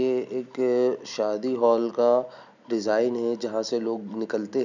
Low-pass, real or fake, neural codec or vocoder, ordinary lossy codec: 7.2 kHz; real; none; none